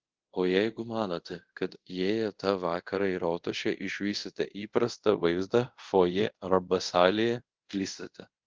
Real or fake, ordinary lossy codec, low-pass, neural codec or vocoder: fake; Opus, 16 kbps; 7.2 kHz; codec, 24 kHz, 0.5 kbps, DualCodec